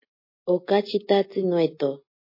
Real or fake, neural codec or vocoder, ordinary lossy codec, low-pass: real; none; MP3, 24 kbps; 5.4 kHz